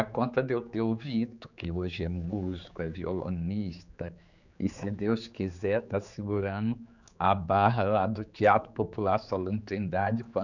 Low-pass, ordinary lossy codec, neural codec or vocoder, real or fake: 7.2 kHz; none; codec, 16 kHz, 4 kbps, X-Codec, HuBERT features, trained on general audio; fake